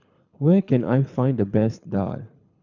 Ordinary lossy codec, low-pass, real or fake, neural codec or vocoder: none; 7.2 kHz; fake; codec, 24 kHz, 6 kbps, HILCodec